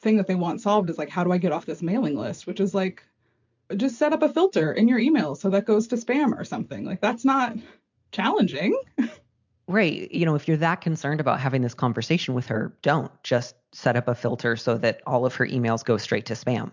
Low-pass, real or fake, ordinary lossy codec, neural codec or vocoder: 7.2 kHz; real; MP3, 64 kbps; none